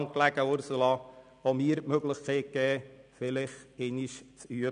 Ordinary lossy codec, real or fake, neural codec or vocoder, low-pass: none; real; none; 9.9 kHz